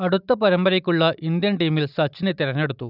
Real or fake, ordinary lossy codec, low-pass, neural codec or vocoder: real; none; 5.4 kHz; none